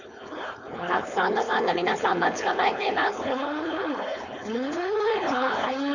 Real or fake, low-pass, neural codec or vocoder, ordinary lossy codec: fake; 7.2 kHz; codec, 16 kHz, 4.8 kbps, FACodec; none